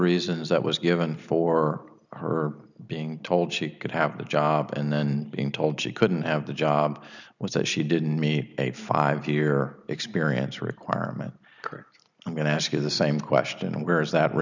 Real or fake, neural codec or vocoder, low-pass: real; none; 7.2 kHz